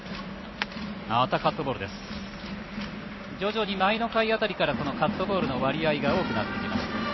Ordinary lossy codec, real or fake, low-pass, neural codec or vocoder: MP3, 24 kbps; fake; 7.2 kHz; vocoder, 44.1 kHz, 128 mel bands every 256 samples, BigVGAN v2